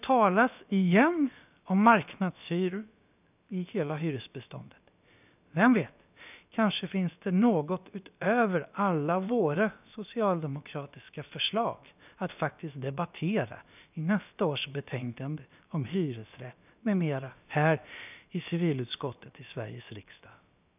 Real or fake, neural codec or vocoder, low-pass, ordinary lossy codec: fake; codec, 16 kHz, about 1 kbps, DyCAST, with the encoder's durations; 3.6 kHz; none